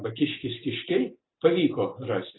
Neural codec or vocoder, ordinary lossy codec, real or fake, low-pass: none; AAC, 16 kbps; real; 7.2 kHz